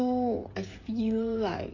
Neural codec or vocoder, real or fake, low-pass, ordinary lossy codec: codec, 16 kHz, 16 kbps, FreqCodec, smaller model; fake; 7.2 kHz; AAC, 32 kbps